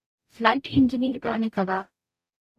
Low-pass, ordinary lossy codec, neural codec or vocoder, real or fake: 14.4 kHz; none; codec, 44.1 kHz, 0.9 kbps, DAC; fake